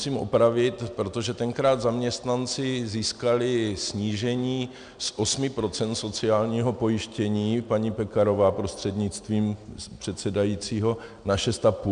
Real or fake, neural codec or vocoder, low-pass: real; none; 9.9 kHz